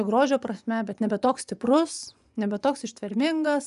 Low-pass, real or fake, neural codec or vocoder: 10.8 kHz; fake; vocoder, 24 kHz, 100 mel bands, Vocos